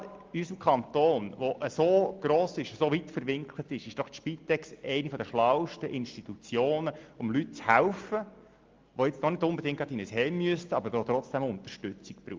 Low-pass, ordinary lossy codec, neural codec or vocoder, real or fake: 7.2 kHz; Opus, 32 kbps; none; real